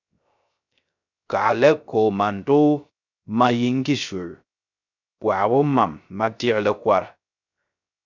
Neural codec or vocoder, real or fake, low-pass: codec, 16 kHz, 0.3 kbps, FocalCodec; fake; 7.2 kHz